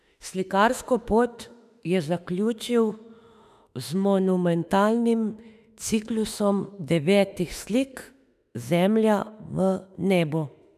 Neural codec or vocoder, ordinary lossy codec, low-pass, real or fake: autoencoder, 48 kHz, 32 numbers a frame, DAC-VAE, trained on Japanese speech; none; 14.4 kHz; fake